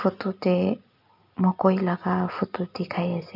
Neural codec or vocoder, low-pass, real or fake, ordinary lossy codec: none; 5.4 kHz; real; none